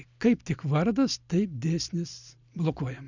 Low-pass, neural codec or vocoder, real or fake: 7.2 kHz; none; real